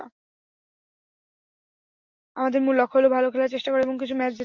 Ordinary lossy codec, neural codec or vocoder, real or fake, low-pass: MP3, 64 kbps; none; real; 7.2 kHz